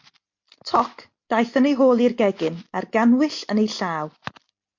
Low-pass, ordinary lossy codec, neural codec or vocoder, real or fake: 7.2 kHz; MP3, 48 kbps; none; real